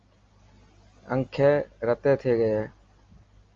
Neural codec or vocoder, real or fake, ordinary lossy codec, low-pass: none; real; Opus, 32 kbps; 7.2 kHz